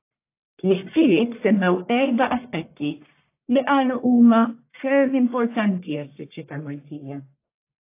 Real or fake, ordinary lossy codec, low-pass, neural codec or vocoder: fake; AAC, 24 kbps; 3.6 kHz; codec, 44.1 kHz, 1.7 kbps, Pupu-Codec